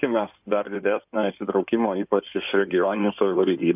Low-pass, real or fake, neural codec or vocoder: 3.6 kHz; fake; codec, 16 kHz in and 24 kHz out, 2.2 kbps, FireRedTTS-2 codec